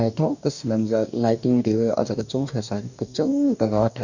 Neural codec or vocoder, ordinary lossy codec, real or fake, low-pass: codec, 44.1 kHz, 2.6 kbps, DAC; none; fake; 7.2 kHz